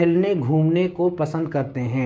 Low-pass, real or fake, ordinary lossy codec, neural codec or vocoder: none; fake; none; codec, 16 kHz, 6 kbps, DAC